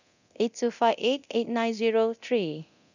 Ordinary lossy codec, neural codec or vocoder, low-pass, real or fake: none; codec, 24 kHz, 0.9 kbps, DualCodec; 7.2 kHz; fake